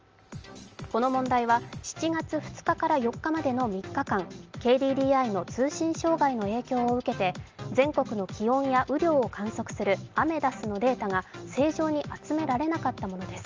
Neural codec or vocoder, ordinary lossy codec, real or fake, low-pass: none; Opus, 24 kbps; real; 7.2 kHz